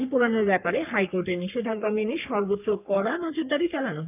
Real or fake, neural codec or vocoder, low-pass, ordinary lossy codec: fake; codec, 44.1 kHz, 3.4 kbps, Pupu-Codec; 3.6 kHz; none